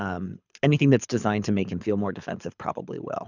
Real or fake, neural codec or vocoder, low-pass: fake; codec, 24 kHz, 6 kbps, HILCodec; 7.2 kHz